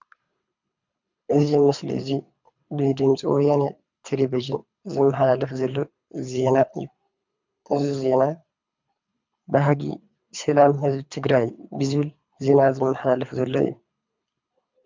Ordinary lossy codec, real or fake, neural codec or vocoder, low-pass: MP3, 64 kbps; fake; codec, 24 kHz, 3 kbps, HILCodec; 7.2 kHz